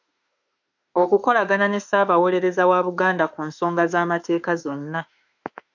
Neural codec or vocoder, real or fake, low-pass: autoencoder, 48 kHz, 32 numbers a frame, DAC-VAE, trained on Japanese speech; fake; 7.2 kHz